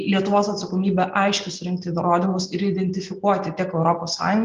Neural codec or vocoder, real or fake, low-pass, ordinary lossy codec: none; real; 7.2 kHz; Opus, 32 kbps